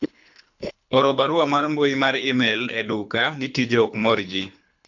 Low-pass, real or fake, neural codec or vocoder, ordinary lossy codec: 7.2 kHz; fake; codec, 24 kHz, 3 kbps, HILCodec; none